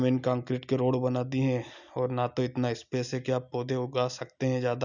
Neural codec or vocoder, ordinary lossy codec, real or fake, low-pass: none; none; real; 7.2 kHz